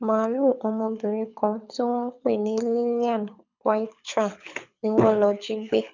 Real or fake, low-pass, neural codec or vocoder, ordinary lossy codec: fake; 7.2 kHz; codec, 24 kHz, 6 kbps, HILCodec; none